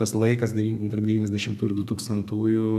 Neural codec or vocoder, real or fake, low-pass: codec, 32 kHz, 1.9 kbps, SNAC; fake; 14.4 kHz